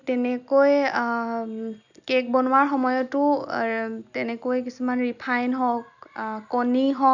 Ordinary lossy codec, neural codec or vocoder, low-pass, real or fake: none; none; 7.2 kHz; real